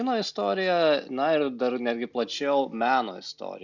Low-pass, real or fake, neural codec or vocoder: 7.2 kHz; real; none